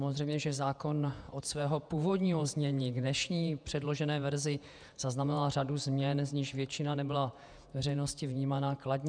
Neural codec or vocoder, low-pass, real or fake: vocoder, 48 kHz, 128 mel bands, Vocos; 9.9 kHz; fake